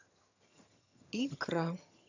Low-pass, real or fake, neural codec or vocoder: 7.2 kHz; fake; vocoder, 22.05 kHz, 80 mel bands, HiFi-GAN